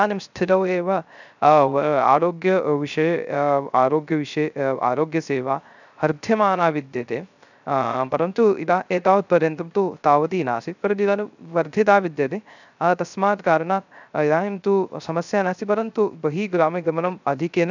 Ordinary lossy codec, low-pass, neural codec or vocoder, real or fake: none; 7.2 kHz; codec, 16 kHz, 0.3 kbps, FocalCodec; fake